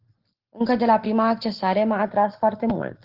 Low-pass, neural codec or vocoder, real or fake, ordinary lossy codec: 5.4 kHz; none; real; Opus, 16 kbps